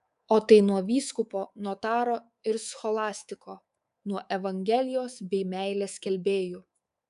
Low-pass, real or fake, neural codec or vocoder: 10.8 kHz; fake; codec, 24 kHz, 3.1 kbps, DualCodec